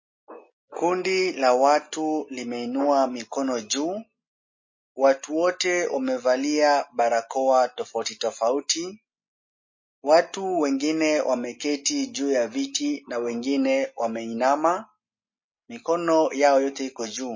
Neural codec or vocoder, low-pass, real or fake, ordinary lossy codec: none; 7.2 kHz; real; MP3, 32 kbps